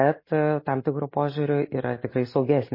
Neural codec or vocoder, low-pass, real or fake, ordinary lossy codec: none; 5.4 kHz; real; MP3, 24 kbps